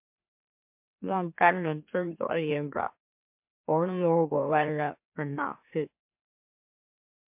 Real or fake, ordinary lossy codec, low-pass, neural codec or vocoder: fake; MP3, 32 kbps; 3.6 kHz; autoencoder, 44.1 kHz, a latent of 192 numbers a frame, MeloTTS